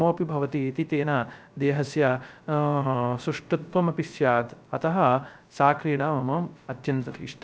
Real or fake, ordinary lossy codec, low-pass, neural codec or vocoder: fake; none; none; codec, 16 kHz, 0.3 kbps, FocalCodec